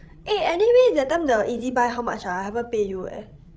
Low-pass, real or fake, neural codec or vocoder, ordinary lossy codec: none; fake; codec, 16 kHz, 16 kbps, FreqCodec, larger model; none